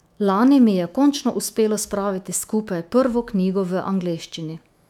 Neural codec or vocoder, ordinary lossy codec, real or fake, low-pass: autoencoder, 48 kHz, 128 numbers a frame, DAC-VAE, trained on Japanese speech; none; fake; 19.8 kHz